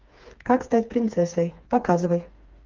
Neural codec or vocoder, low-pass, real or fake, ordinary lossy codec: codec, 16 kHz, 4 kbps, FreqCodec, smaller model; 7.2 kHz; fake; Opus, 32 kbps